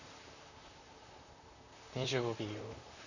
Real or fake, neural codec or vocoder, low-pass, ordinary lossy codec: fake; vocoder, 44.1 kHz, 128 mel bands, Pupu-Vocoder; 7.2 kHz; none